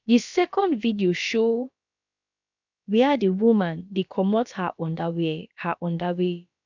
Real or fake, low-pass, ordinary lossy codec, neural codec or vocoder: fake; 7.2 kHz; none; codec, 16 kHz, about 1 kbps, DyCAST, with the encoder's durations